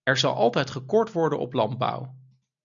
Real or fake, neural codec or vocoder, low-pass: real; none; 7.2 kHz